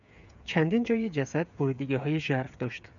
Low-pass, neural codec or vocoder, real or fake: 7.2 kHz; codec, 16 kHz, 8 kbps, FreqCodec, smaller model; fake